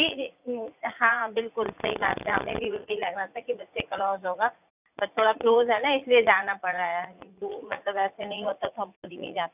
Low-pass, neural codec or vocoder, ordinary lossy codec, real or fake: 3.6 kHz; vocoder, 44.1 kHz, 80 mel bands, Vocos; none; fake